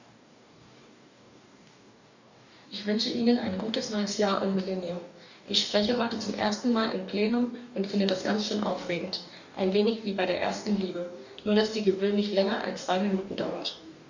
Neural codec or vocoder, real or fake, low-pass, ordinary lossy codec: codec, 44.1 kHz, 2.6 kbps, DAC; fake; 7.2 kHz; none